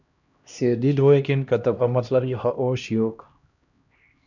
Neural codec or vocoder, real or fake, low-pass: codec, 16 kHz, 1 kbps, X-Codec, HuBERT features, trained on LibriSpeech; fake; 7.2 kHz